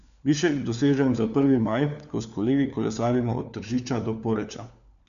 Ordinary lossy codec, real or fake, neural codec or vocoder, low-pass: none; fake; codec, 16 kHz, 4 kbps, FunCodec, trained on LibriTTS, 50 frames a second; 7.2 kHz